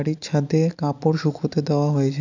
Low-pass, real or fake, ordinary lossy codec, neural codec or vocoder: 7.2 kHz; real; none; none